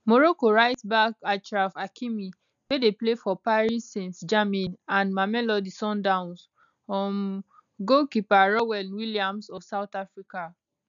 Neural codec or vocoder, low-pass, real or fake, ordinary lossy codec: none; 7.2 kHz; real; none